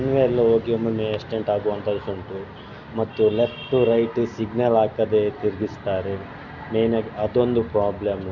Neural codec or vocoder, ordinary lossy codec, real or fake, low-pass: none; Opus, 64 kbps; real; 7.2 kHz